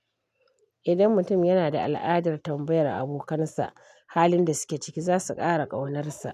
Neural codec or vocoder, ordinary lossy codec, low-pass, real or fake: none; none; 14.4 kHz; real